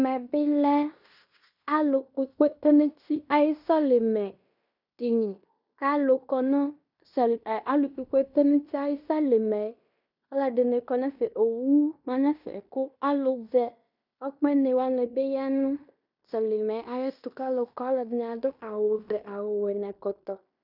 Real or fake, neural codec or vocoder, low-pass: fake; codec, 16 kHz in and 24 kHz out, 0.9 kbps, LongCat-Audio-Codec, fine tuned four codebook decoder; 5.4 kHz